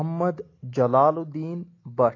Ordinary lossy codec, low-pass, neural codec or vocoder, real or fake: AAC, 32 kbps; 7.2 kHz; none; real